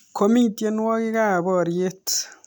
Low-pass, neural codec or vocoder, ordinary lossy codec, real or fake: none; none; none; real